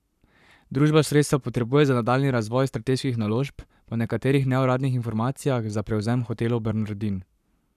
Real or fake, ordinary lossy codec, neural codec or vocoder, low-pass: fake; none; codec, 44.1 kHz, 7.8 kbps, Pupu-Codec; 14.4 kHz